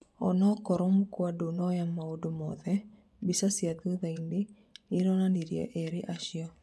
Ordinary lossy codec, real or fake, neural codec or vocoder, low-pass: none; fake; vocoder, 24 kHz, 100 mel bands, Vocos; none